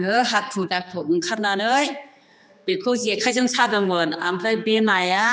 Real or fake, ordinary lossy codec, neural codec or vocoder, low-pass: fake; none; codec, 16 kHz, 2 kbps, X-Codec, HuBERT features, trained on general audio; none